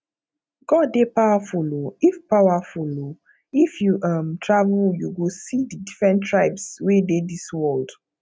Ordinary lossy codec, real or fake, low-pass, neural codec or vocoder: none; real; none; none